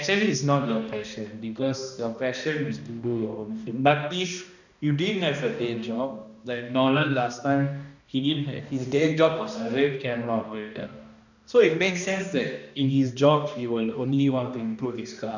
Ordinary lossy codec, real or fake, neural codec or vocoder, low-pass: none; fake; codec, 16 kHz, 1 kbps, X-Codec, HuBERT features, trained on balanced general audio; 7.2 kHz